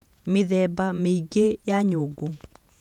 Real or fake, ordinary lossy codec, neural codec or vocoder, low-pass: fake; none; vocoder, 48 kHz, 128 mel bands, Vocos; 19.8 kHz